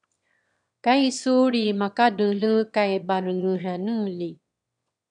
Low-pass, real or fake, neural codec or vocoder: 9.9 kHz; fake; autoencoder, 22.05 kHz, a latent of 192 numbers a frame, VITS, trained on one speaker